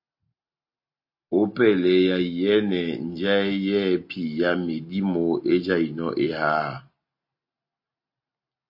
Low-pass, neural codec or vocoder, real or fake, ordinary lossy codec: 5.4 kHz; none; real; MP3, 32 kbps